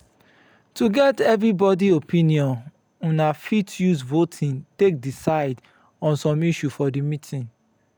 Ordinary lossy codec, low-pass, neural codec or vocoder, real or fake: none; none; none; real